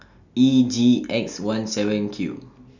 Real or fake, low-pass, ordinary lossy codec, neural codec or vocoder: real; 7.2 kHz; none; none